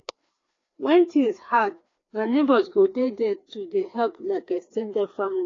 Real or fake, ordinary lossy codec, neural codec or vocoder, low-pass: fake; AAC, 32 kbps; codec, 16 kHz, 2 kbps, FreqCodec, larger model; 7.2 kHz